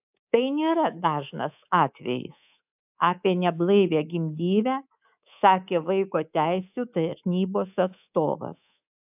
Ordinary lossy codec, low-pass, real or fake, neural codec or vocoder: AAC, 32 kbps; 3.6 kHz; fake; codec, 24 kHz, 3.1 kbps, DualCodec